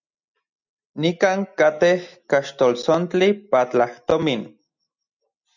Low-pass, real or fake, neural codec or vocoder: 7.2 kHz; real; none